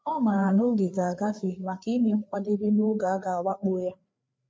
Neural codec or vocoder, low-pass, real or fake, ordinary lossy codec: codec, 16 kHz, 4 kbps, FreqCodec, larger model; none; fake; none